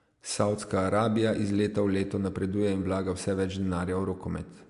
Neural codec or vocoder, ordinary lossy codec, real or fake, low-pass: none; MP3, 64 kbps; real; 10.8 kHz